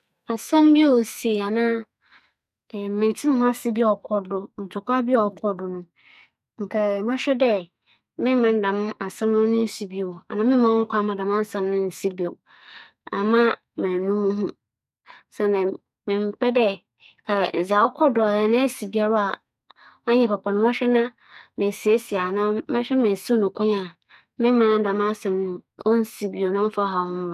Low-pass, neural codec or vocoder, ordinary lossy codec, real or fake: 14.4 kHz; codec, 44.1 kHz, 2.6 kbps, SNAC; none; fake